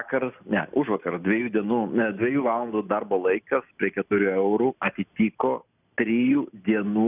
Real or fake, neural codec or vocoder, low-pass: real; none; 3.6 kHz